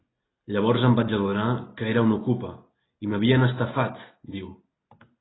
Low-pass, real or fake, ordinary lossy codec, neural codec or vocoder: 7.2 kHz; real; AAC, 16 kbps; none